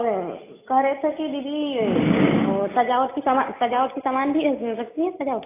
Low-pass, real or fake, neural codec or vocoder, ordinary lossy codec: 3.6 kHz; real; none; AAC, 24 kbps